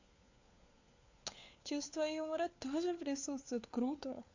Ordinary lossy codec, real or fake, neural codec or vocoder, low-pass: AAC, 48 kbps; fake; codec, 16 kHz, 4 kbps, FunCodec, trained on LibriTTS, 50 frames a second; 7.2 kHz